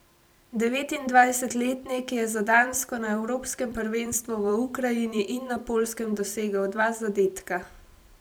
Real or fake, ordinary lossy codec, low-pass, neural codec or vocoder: fake; none; none; vocoder, 44.1 kHz, 128 mel bands every 256 samples, BigVGAN v2